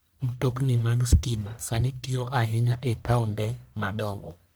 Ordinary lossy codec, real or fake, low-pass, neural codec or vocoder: none; fake; none; codec, 44.1 kHz, 1.7 kbps, Pupu-Codec